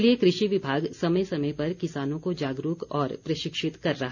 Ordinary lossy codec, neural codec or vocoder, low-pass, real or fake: none; none; 7.2 kHz; real